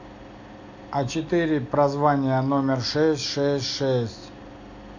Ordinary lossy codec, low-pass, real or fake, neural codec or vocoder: AAC, 48 kbps; 7.2 kHz; real; none